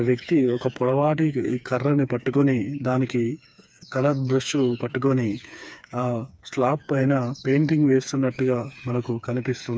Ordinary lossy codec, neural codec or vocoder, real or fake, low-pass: none; codec, 16 kHz, 4 kbps, FreqCodec, smaller model; fake; none